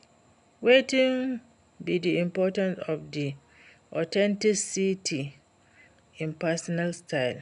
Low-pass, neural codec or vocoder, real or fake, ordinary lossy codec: 10.8 kHz; none; real; none